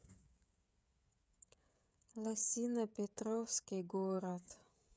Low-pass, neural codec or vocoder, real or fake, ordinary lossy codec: none; codec, 16 kHz, 16 kbps, FreqCodec, smaller model; fake; none